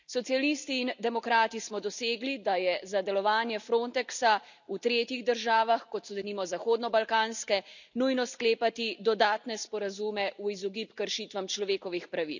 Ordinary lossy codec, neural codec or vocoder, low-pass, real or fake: none; none; 7.2 kHz; real